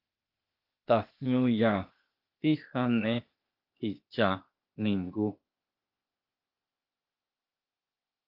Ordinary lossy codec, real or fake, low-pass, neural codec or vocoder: Opus, 24 kbps; fake; 5.4 kHz; codec, 16 kHz, 0.8 kbps, ZipCodec